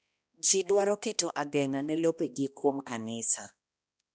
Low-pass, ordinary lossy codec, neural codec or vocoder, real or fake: none; none; codec, 16 kHz, 1 kbps, X-Codec, HuBERT features, trained on balanced general audio; fake